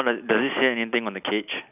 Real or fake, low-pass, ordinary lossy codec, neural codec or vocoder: real; 3.6 kHz; none; none